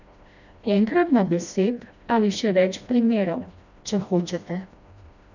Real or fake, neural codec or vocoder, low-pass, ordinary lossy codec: fake; codec, 16 kHz, 1 kbps, FreqCodec, smaller model; 7.2 kHz; none